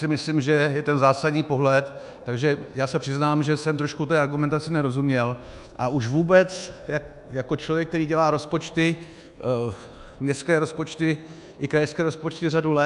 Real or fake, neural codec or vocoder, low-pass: fake; codec, 24 kHz, 1.2 kbps, DualCodec; 10.8 kHz